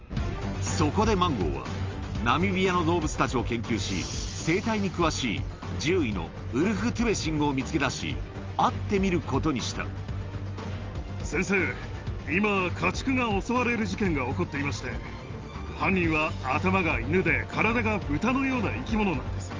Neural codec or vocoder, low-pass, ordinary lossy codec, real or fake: none; 7.2 kHz; Opus, 32 kbps; real